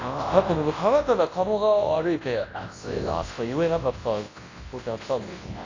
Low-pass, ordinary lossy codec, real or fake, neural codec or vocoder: 7.2 kHz; AAC, 32 kbps; fake; codec, 24 kHz, 0.9 kbps, WavTokenizer, large speech release